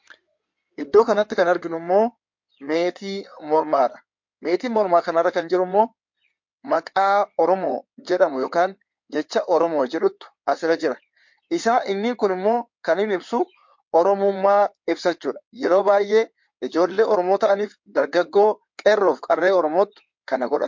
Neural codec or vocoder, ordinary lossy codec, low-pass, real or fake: codec, 16 kHz in and 24 kHz out, 2.2 kbps, FireRedTTS-2 codec; MP3, 48 kbps; 7.2 kHz; fake